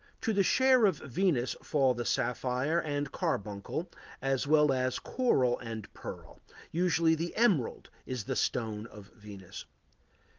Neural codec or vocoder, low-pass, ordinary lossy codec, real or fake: none; 7.2 kHz; Opus, 32 kbps; real